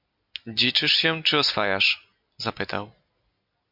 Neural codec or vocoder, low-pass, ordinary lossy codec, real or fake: none; 5.4 kHz; MP3, 48 kbps; real